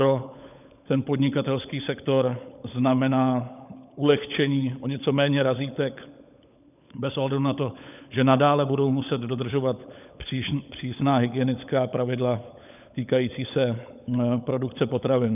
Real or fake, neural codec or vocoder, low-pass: fake; codec, 16 kHz, 16 kbps, FunCodec, trained on LibriTTS, 50 frames a second; 3.6 kHz